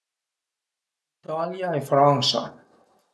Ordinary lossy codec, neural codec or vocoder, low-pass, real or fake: none; none; none; real